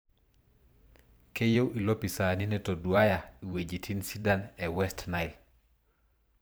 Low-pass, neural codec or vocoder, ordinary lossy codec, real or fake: none; vocoder, 44.1 kHz, 128 mel bands every 512 samples, BigVGAN v2; none; fake